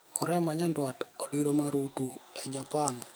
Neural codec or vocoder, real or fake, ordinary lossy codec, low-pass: codec, 44.1 kHz, 2.6 kbps, SNAC; fake; none; none